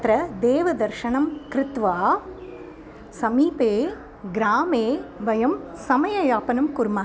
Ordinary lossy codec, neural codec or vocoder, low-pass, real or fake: none; none; none; real